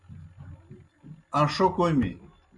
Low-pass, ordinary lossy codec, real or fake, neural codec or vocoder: 10.8 kHz; Opus, 64 kbps; real; none